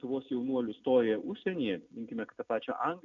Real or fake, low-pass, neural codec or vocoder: real; 7.2 kHz; none